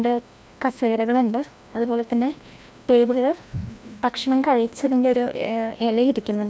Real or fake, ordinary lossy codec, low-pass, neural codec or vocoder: fake; none; none; codec, 16 kHz, 1 kbps, FreqCodec, larger model